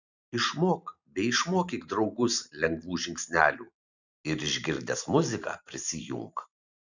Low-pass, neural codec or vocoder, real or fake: 7.2 kHz; vocoder, 44.1 kHz, 128 mel bands every 512 samples, BigVGAN v2; fake